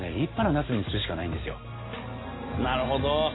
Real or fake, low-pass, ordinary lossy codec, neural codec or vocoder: real; 7.2 kHz; AAC, 16 kbps; none